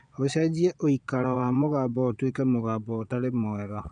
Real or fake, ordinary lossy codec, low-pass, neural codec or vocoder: fake; none; 9.9 kHz; vocoder, 22.05 kHz, 80 mel bands, Vocos